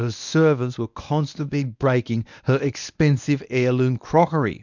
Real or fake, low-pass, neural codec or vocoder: fake; 7.2 kHz; codec, 24 kHz, 0.9 kbps, WavTokenizer, medium speech release version 1